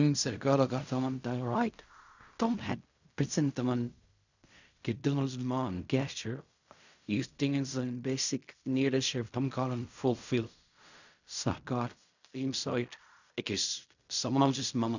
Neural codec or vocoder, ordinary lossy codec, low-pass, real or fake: codec, 16 kHz in and 24 kHz out, 0.4 kbps, LongCat-Audio-Codec, fine tuned four codebook decoder; none; 7.2 kHz; fake